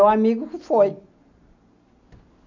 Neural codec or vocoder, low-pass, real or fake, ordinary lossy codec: none; 7.2 kHz; real; none